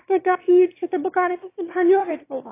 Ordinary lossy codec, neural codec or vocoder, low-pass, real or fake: AAC, 24 kbps; autoencoder, 22.05 kHz, a latent of 192 numbers a frame, VITS, trained on one speaker; 3.6 kHz; fake